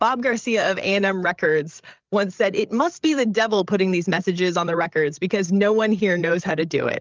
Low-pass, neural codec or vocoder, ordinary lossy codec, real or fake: 7.2 kHz; codec, 16 kHz, 8 kbps, FreqCodec, larger model; Opus, 32 kbps; fake